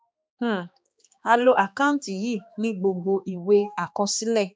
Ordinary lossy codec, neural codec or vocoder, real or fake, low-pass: none; codec, 16 kHz, 2 kbps, X-Codec, HuBERT features, trained on balanced general audio; fake; none